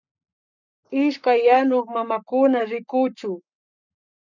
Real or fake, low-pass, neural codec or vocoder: fake; 7.2 kHz; codec, 44.1 kHz, 7.8 kbps, Pupu-Codec